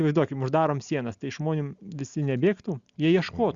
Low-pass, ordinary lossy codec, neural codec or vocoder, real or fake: 7.2 kHz; Opus, 64 kbps; none; real